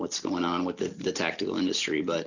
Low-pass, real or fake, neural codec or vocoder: 7.2 kHz; real; none